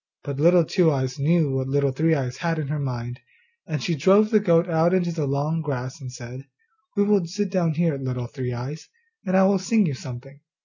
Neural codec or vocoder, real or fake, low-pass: none; real; 7.2 kHz